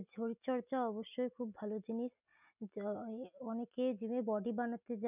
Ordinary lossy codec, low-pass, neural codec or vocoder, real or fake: none; 3.6 kHz; none; real